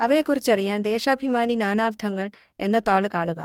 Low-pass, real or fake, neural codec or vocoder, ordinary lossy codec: 19.8 kHz; fake; codec, 44.1 kHz, 2.6 kbps, DAC; MP3, 96 kbps